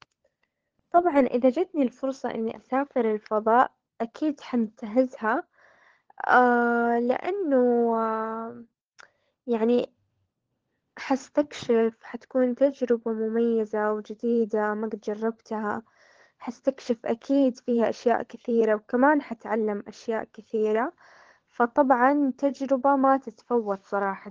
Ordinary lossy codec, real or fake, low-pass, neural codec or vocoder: Opus, 16 kbps; fake; 7.2 kHz; codec, 16 kHz, 16 kbps, FunCodec, trained on LibriTTS, 50 frames a second